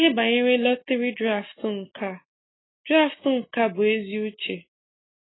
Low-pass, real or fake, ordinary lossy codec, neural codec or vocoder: 7.2 kHz; real; AAC, 16 kbps; none